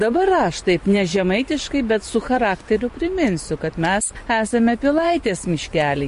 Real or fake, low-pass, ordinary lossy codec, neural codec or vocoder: fake; 10.8 kHz; MP3, 48 kbps; vocoder, 24 kHz, 100 mel bands, Vocos